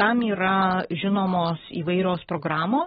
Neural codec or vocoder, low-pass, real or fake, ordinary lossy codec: none; 19.8 kHz; real; AAC, 16 kbps